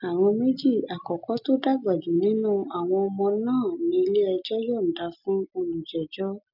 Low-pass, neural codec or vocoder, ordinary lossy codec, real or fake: 5.4 kHz; none; none; real